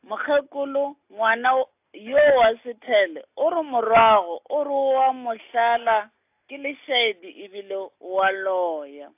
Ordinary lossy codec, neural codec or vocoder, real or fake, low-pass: AAC, 32 kbps; none; real; 3.6 kHz